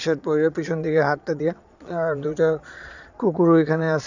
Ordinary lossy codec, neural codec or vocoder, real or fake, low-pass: none; vocoder, 22.05 kHz, 80 mel bands, Vocos; fake; 7.2 kHz